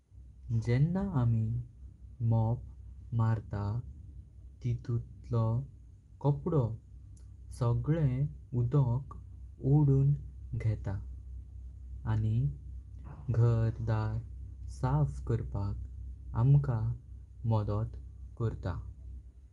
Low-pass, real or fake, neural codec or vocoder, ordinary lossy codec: 10.8 kHz; real; none; Opus, 32 kbps